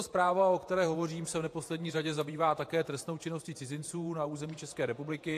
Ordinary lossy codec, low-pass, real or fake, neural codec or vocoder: AAC, 64 kbps; 14.4 kHz; fake; vocoder, 48 kHz, 128 mel bands, Vocos